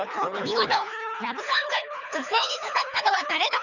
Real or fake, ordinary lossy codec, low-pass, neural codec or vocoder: fake; none; 7.2 kHz; codec, 24 kHz, 3 kbps, HILCodec